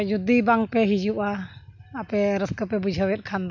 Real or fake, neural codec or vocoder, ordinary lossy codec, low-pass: real; none; none; 7.2 kHz